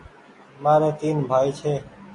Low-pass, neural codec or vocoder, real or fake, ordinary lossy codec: 10.8 kHz; none; real; AAC, 48 kbps